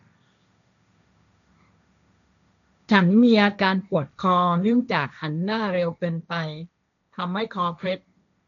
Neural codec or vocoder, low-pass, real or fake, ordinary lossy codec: codec, 16 kHz, 1.1 kbps, Voila-Tokenizer; 7.2 kHz; fake; none